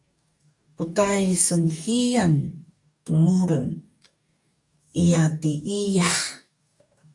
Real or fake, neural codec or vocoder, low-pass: fake; codec, 44.1 kHz, 2.6 kbps, DAC; 10.8 kHz